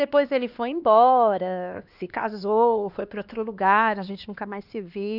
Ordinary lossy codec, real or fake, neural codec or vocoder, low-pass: none; fake; codec, 16 kHz, 2 kbps, X-Codec, HuBERT features, trained on LibriSpeech; 5.4 kHz